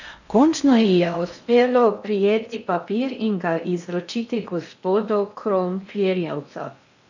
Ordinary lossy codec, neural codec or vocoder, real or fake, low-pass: none; codec, 16 kHz in and 24 kHz out, 0.6 kbps, FocalCodec, streaming, 4096 codes; fake; 7.2 kHz